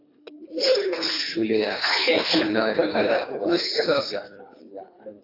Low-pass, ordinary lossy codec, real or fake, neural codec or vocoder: 5.4 kHz; AAC, 24 kbps; fake; codec, 24 kHz, 3 kbps, HILCodec